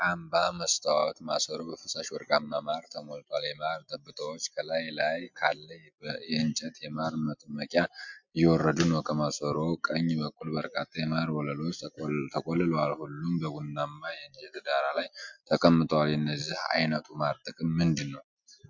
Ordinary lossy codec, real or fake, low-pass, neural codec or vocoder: MP3, 64 kbps; real; 7.2 kHz; none